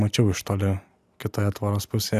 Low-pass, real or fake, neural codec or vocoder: 14.4 kHz; real; none